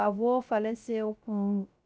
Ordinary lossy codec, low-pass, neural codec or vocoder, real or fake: none; none; codec, 16 kHz, 0.3 kbps, FocalCodec; fake